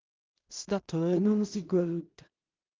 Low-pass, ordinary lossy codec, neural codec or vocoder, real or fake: 7.2 kHz; Opus, 32 kbps; codec, 16 kHz in and 24 kHz out, 0.4 kbps, LongCat-Audio-Codec, two codebook decoder; fake